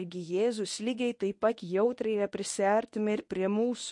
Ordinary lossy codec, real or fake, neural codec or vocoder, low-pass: MP3, 48 kbps; fake; codec, 24 kHz, 0.9 kbps, WavTokenizer, medium speech release version 1; 10.8 kHz